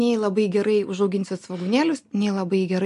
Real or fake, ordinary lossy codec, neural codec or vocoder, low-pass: real; MP3, 64 kbps; none; 10.8 kHz